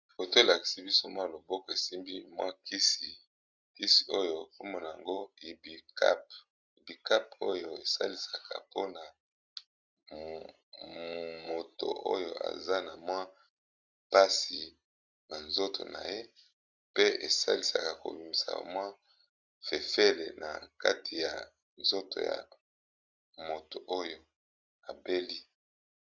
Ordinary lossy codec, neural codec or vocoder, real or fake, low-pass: Opus, 64 kbps; none; real; 7.2 kHz